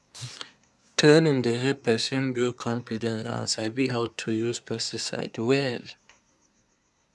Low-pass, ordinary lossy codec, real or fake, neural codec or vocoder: none; none; fake; codec, 24 kHz, 1 kbps, SNAC